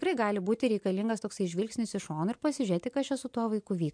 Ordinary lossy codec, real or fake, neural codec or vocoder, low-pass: MP3, 64 kbps; real; none; 9.9 kHz